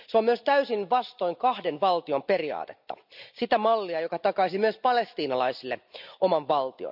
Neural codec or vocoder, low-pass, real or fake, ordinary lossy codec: none; 5.4 kHz; real; none